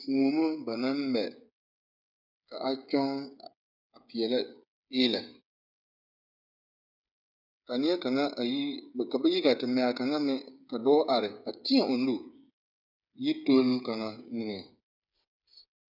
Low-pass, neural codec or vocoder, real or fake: 5.4 kHz; codec, 16 kHz, 8 kbps, FreqCodec, smaller model; fake